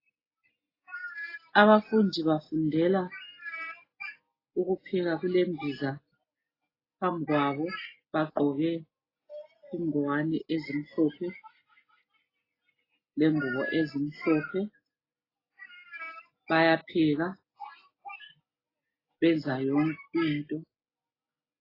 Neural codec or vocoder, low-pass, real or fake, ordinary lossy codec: none; 5.4 kHz; real; AAC, 24 kbps